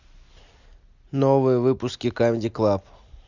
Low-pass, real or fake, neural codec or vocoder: 7.2 kHz; real; none